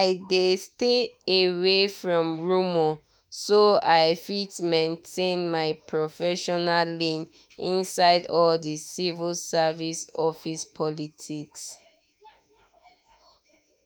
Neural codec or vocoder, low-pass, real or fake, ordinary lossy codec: autoencoder, 48 kHz, 32 numbers a frame, DAC-VAE, trained on Japanese speech; none; fake; none